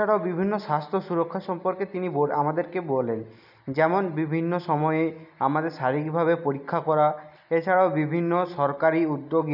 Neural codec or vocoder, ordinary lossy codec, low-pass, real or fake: none; none; 5.4 kHz; real